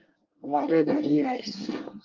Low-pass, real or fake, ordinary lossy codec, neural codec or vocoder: 7.2 kHz; fake; Opus, 32 kbps; codec, 24 kHz, 1 kbps, SNAC